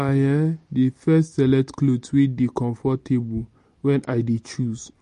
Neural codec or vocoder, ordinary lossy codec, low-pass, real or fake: none; MP3, 48 kbps; 14.4 kHz; real